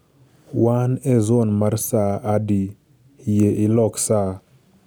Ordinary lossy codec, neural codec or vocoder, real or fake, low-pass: none; none; real; none